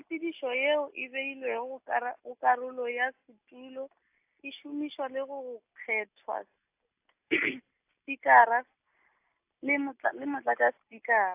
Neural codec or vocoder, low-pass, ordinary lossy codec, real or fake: none; 3.6 kHz; AAC, 32 kbps; real